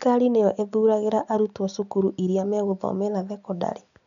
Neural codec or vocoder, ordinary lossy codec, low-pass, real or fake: none; none; 7.2 kHz; real